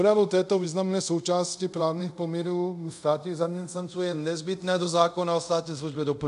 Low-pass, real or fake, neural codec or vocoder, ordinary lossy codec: 10.8 kHz; fake; codec, 24 kHz, 0.5 kbps, DualCodec; MP3, 96 kbps